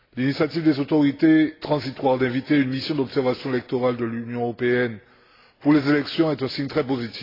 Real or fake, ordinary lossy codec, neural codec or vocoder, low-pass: real; AAC, 24 kbps; none; 5.4 kHz